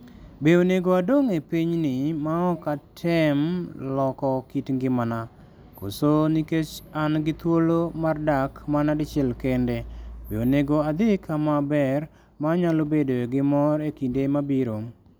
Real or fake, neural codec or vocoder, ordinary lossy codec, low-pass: real; none; none; none